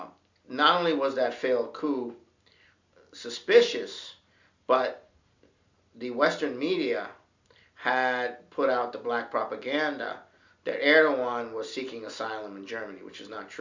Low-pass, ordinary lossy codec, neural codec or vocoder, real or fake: 7.2 kHz; MP3, 64 kbps; none; real